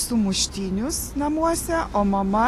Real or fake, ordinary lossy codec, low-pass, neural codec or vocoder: real; AAC, 48 kbps; 14.4 kHz; none